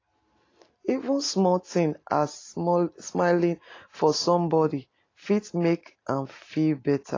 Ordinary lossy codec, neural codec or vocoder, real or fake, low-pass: AAC, 32 kbps; none; real; 7.2 kHz